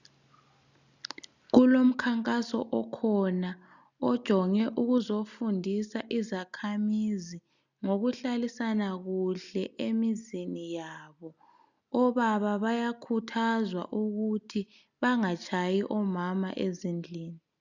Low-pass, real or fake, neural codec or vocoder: 7.2 kHz; real; none